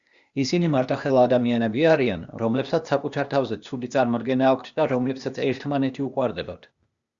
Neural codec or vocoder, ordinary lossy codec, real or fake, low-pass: codec, 16 kHz, 0.8 kbps, ZipCodec; Opus, 64 kbps; fake; 7.2 kHz